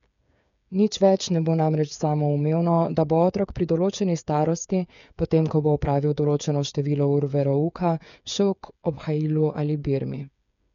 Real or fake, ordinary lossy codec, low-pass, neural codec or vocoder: fake; none; 7.2 kHz; codec, 16 kHz, 8 kbps, FreqCodec, smaller model